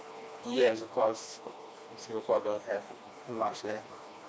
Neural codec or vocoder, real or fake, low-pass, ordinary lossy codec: codec, 16 kHz, 2 kbps, FreqCodec, smaller model; fake; none; none